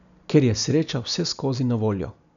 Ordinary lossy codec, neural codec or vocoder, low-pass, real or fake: none; none; 7.2 kHz; real